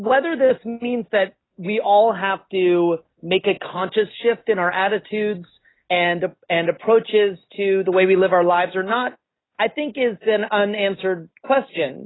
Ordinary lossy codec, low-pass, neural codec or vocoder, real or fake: AAC, 16 kbps; 7.2 kHz; none; real